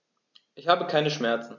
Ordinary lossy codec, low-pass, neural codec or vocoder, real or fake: none; 7.2 kHz; none; real